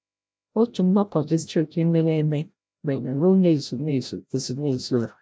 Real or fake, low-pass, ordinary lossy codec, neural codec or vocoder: fake; none; none; codec, 16 kHz, 0.5 kbps, FreqCodec, larger model